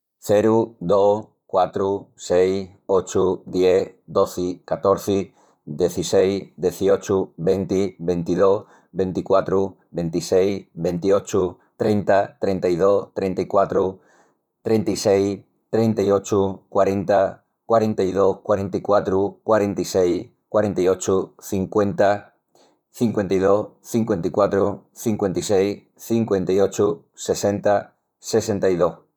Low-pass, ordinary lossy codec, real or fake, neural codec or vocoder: 19.8 kHz; none; fake; vocoder, 44.1 kHz, 128 mel bands, Pupu-Vocoder